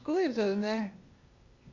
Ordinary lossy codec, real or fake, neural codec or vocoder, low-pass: Opus, 64 kbps; fake; codec, 16 kHz, 0.8 kbps, ZipCodec; 7.2 kHz